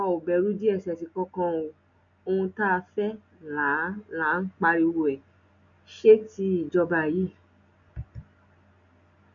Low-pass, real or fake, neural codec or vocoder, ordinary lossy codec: 7.2 kHz; real; none; none